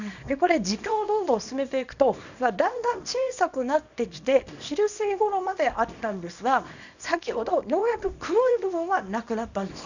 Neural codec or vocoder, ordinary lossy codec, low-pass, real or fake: codec, 24 kHz, 0.9 kbps, WavTokenizer, small release; none; 7.2 kHz; fake